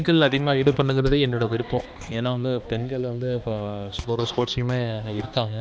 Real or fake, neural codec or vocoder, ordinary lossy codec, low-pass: fake; codec, 16 kHz, 2 kbps, X-Codec, HuBERT features, trained on balanced general audio; none; none